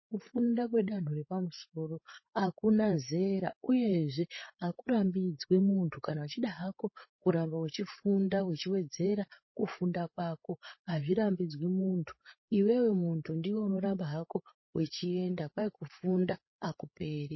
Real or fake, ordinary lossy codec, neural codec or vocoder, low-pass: fake; MP3, 24 kbps; codec, 16 kHz, 8 kbps, FreqCodec, larger model; 7.2 kHz